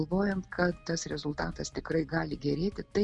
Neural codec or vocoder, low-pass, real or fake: none; 10.8 kHz; real